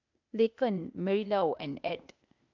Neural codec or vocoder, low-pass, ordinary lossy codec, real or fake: codec, 16 kHz, 0.8 kbps, ZipCodec; 7.2 kHz; none; fake